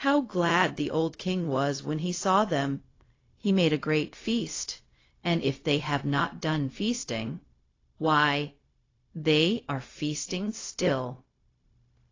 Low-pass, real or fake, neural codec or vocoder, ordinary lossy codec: 7.2 kHz; fake; codec, 16 kHz, 0.4 kbps, LongCat-Audio-Codec; AAC, 32 kbps